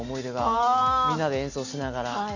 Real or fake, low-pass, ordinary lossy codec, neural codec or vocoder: real; 7.2 kHz; none; none